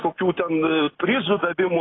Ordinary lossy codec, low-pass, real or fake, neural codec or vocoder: AAC, 16 kbps; 7.2 kHz; real; none